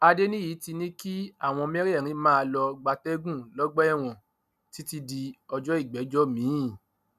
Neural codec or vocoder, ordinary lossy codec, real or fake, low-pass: none; none; real; 14.4 kHz